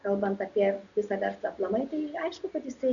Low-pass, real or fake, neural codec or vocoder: 7.2 kHz; real; none